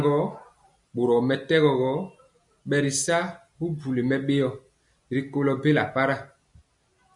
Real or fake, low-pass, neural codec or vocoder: real; 10.8 kHz; none